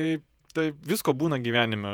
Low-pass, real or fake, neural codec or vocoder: 19.8 kHz; fake; vocoder, 48 kHz, 128 mel bands, Vocos